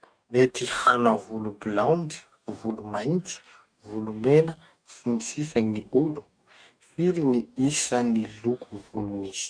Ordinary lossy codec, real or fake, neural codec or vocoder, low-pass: none; fake; codec, 44.1 kHz, 2.6 kbps, DAC; 9.9 kHz